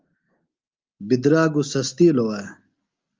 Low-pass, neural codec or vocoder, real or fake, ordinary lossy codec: 7.2 kHz; none; real; Opus, 32 kbps